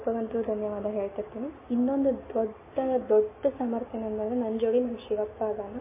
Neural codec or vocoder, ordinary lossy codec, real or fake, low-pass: none; MP3, 16 kbps; real; 3.6 kHz